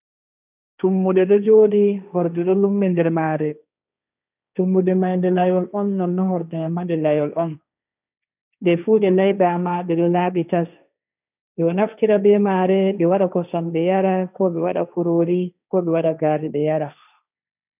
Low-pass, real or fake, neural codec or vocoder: 3.6 kHz; fake; codec, 16 kHz, 1.1 kbps, Voila-Tokenizer